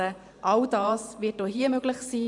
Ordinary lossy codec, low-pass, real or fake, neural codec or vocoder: MP3, 96 kbps; 10.8 kHz; fake; vocoder, 44.1 kHz, 128 mel bands every 512 samples, BigVGAN v2